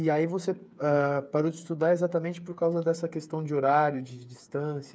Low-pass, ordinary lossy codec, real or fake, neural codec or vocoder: none; none; fake; codec, 16 kHz, 8 kbps, FreqCodec, smaller model